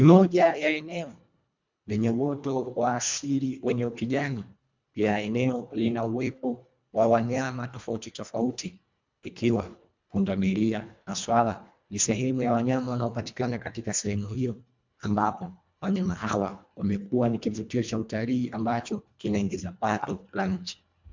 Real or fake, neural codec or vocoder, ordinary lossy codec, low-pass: fake; codec, 24 kHz, 1.5 kbps, HILCodec; MP3, 64 kbps; 7.2 kHz